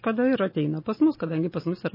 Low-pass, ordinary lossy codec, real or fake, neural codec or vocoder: 5.4 kHz; MP3, 24 kbps; real; none